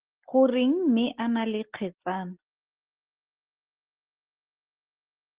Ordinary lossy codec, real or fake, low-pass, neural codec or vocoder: Opus, 16 kbps; real; 3.6 kHz; none